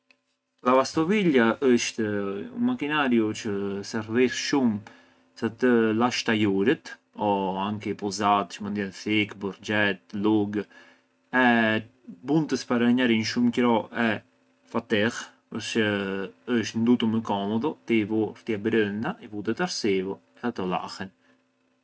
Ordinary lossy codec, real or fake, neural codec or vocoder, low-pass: none; real; none; none